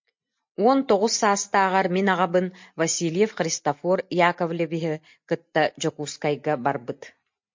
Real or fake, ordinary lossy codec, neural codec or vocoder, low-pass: real; MP3, 48 kbps; none; 7.2 kHz